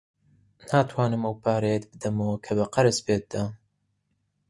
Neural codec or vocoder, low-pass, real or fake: none; 10.8 kHz; real